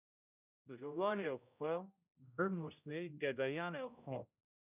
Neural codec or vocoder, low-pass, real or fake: codec, 16 kHz, 0.5 kbps, X-Codec, HuBERT features, trained on general audio; 3.6 kHz; fake